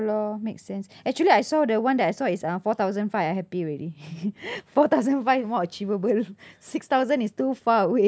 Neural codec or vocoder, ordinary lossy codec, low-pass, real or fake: none; none; none; real